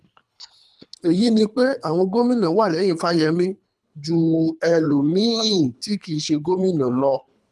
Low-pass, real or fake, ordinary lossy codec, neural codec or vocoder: none; fake; none; codec, 24 kHz, 3 kbps, HILCodec